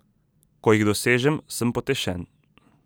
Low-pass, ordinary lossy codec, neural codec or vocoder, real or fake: none; none; none; real